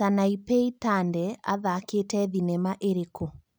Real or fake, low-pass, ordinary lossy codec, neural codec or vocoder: real; none; none; none